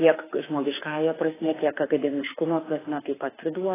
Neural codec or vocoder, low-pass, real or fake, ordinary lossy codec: autoencoder, 48 kHz, 32 numbers a frame, DAC-VAE, trained on Japanese speech; 3.6 kHz; fake; AAC, 16 kbps